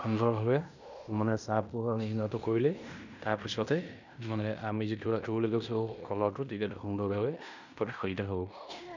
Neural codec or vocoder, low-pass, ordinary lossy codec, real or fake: codec, 16 kHz in and 24 kHz out, 0.9 kbps, LongCat-Audio-Codec, fine tuned four codebook decoder; 7.2 kHz; none; fake